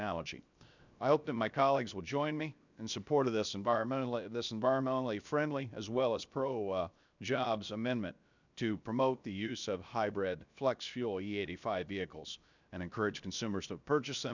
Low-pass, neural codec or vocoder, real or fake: 7.2 kHz; codec, 16 kHz, 0.7 kbps, FocalCodec; fake